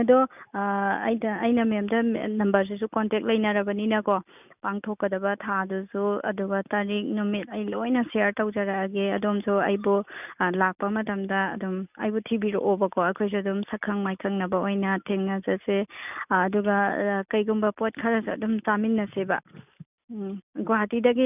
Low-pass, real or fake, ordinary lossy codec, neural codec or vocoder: 3.6 kHz; real; none; none